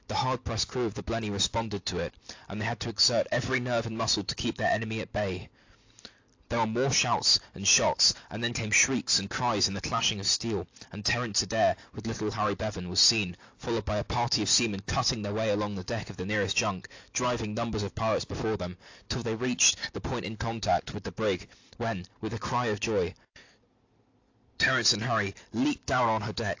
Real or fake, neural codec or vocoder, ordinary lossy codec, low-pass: real; none; AAC, 48 kbps; 7.2 kHz